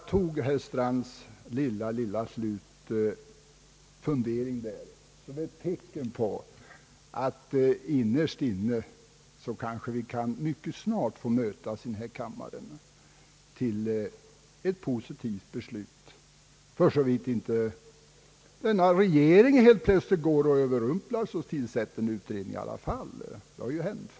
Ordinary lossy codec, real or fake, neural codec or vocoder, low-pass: none; real; none; none